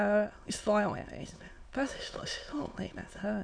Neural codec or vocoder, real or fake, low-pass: autoencoder, 22.05 kHz, a latent of 192 numbers a frame, VITS, trained on many speakers; fake; 9.9 kHz